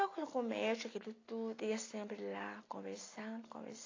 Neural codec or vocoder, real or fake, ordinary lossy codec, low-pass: none; real; AAC, 32 kbps; 7.2 kHz